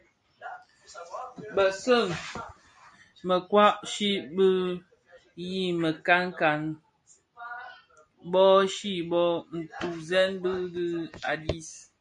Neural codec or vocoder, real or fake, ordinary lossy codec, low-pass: none; real; MP3, 48 kbps; 9.9 kHz